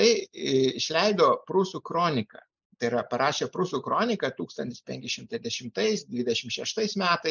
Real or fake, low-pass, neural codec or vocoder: real; 7.2 kHz; none